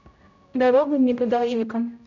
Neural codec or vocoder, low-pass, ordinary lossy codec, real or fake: codec, 16 kHz, 0.5 kbps, X-Codec, HuBERT features, trained on general audio; 7.2 kHz; none; fake